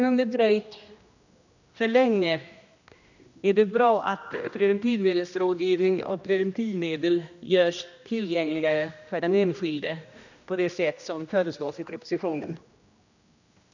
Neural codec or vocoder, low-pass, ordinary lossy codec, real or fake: codec, 16 kHz, 1 kbps, X-Codec, HuBERT features, trained on general audio; 7.2 kHz; none; fake